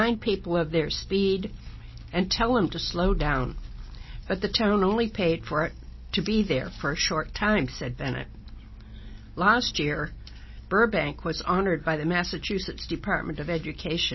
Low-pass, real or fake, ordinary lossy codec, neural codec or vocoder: 7.2 kHz; real; MP3, 24 kbps; none